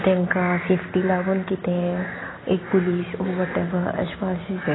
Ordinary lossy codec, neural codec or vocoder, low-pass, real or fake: AAC, 16 kbps; none; 7.2 kHz; real